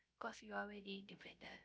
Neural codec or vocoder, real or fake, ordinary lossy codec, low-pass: codec, 16 kHz, 0.3 kbps, FocalCodec; fake; none; none